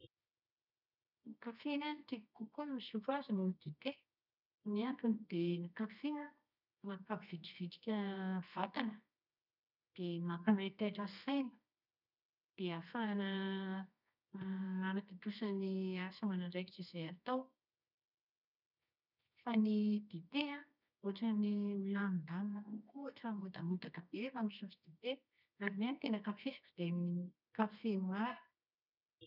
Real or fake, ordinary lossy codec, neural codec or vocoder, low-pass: fake; none; codec, 24 kHz, 0.9 kbps, WavTokenizer, medium music audio release; 5.4 kHz